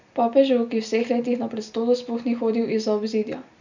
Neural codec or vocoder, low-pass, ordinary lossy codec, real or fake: none; 7.2 kHz; none; real